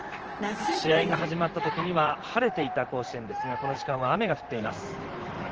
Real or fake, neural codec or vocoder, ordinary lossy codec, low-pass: fake; vocoder, 44.1 kHz, 128 mel bands, Pupu-Vocoder; Opus, 16 kbps; 7.2 kHz